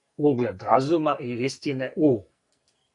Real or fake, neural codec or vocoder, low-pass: fake; codec, 44.1 kHz, 2.6 kbps, SNAC; 10.8 kHz